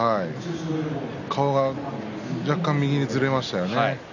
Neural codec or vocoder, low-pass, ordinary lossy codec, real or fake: none; 7.2 kHz; none; real